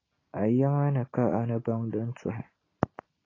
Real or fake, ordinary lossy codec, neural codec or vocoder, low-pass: real; AAC, 32 kbps; none; 7.2 kHz